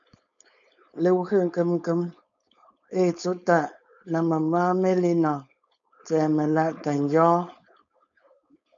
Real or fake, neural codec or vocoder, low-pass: fake; codec, 16 kHz, 4.8 kbps, FACodec; 7.2 kHz